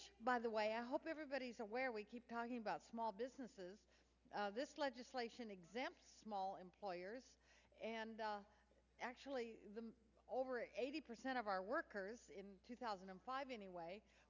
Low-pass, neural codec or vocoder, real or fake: 7.2 kHz; none; real